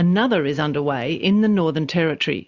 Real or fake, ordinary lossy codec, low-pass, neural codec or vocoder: real; Opus, 64 kbps; 7.2 kHz; none